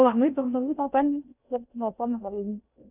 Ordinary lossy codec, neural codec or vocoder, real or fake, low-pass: none; codec, 16 kHz in and 24 kHz out, 0.6 kbps, FocalCodec, streaming, 2048 codes; fake; 3.6 kHz